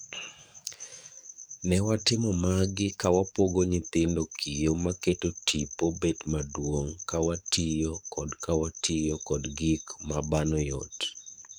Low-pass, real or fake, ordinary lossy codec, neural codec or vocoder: none; fake; none; codec, 44.1 kHz, 7.8 kbps, DAC